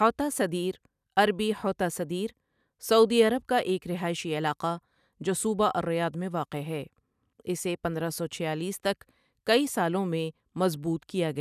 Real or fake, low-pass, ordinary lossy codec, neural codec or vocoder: real; 14.4 kHz; none; none